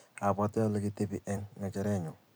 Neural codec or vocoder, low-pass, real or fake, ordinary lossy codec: vocoder, 44.1 kHz, 128 mel bands every 256 samples, BigVGAN v2; none; fake; none